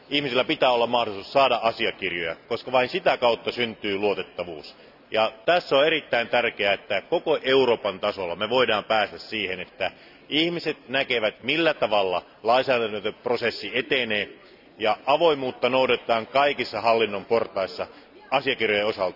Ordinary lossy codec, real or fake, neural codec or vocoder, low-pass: none; real; none; 5.4 kHz